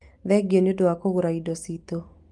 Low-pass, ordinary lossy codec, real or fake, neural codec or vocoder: 10.8 kHz; Opus, 24 kbps; real; none